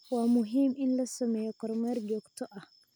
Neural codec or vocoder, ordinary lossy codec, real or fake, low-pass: none; none; real; none